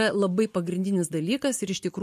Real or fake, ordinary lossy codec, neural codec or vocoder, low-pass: real; MP3, 64 kbps; none; 14.4 kHz